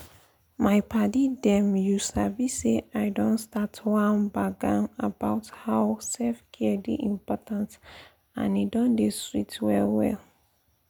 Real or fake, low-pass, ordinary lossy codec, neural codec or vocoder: real; none; none; none